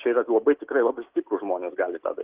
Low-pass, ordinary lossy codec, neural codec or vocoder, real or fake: 3.6 kHz; Opus, 16 kbps; none; real